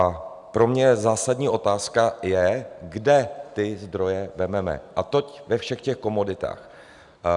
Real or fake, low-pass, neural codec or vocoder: real; 10.8 kHz; none